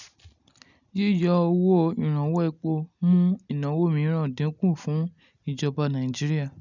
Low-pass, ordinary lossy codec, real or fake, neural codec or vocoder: 7.2 kHz; none; real; none